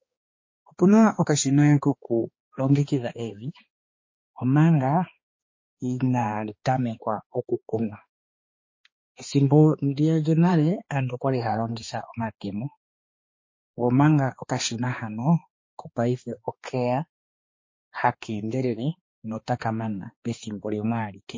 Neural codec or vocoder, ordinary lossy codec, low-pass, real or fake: codec, 16 kHz, 2 kbps, X-Codec, HuBERT features, trained on balanced general audio; MP3, 32 kbps; 7.2 kHz; fake